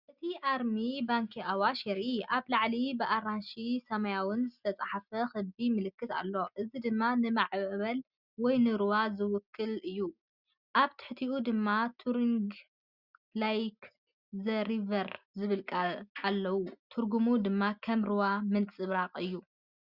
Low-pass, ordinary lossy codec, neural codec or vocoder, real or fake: 5.4 kHz; Opus, 64 kbps; none; real